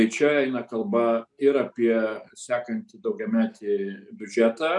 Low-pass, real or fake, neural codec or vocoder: 10.8 kHz; real; none